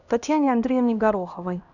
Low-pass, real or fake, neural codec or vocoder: 7.2 kHz; fake; codec, 16 kHz, 1 kbps, X-Codec, HuBERT features, trained on LibriSpeech